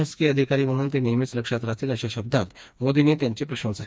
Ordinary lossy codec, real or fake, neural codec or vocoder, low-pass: none; fake; codec, 16 kHz, 2 kbps, FreqCodec, smaller model; none